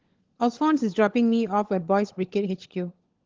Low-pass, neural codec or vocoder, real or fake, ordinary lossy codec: 7.2 kHz; codec, 16 kHz, 16 kbps, FunCodec, trained on LibriTTS, 50 frames a second; fake; Opus, 16 kbps